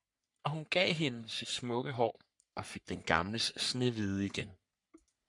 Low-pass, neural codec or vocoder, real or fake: 10.8 kHz; codec, 44.1 kHz, 3.4 kbps, Pupu-Codec; fake